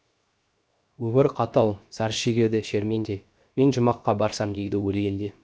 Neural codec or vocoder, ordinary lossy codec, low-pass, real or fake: codec, 16 kHz, 0.7 kbps, FocalCodec; none; none; fake